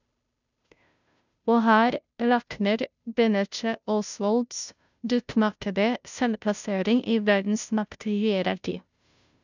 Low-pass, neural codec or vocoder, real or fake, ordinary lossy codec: 7.2 kHz; codec, 16 kHz, 0.5 kbps, FunCodec, trained on Chinese and English, 25 frames a second; fake; none